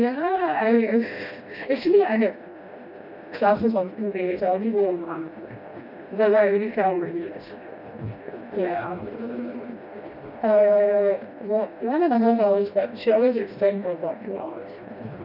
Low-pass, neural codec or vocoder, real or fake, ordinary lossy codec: 5.4 kHz; codec, 16 kHz, 1 kbps, FreqCodec, smaller model; fake; none